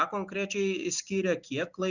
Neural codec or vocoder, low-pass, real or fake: none; 7.2 kHz; real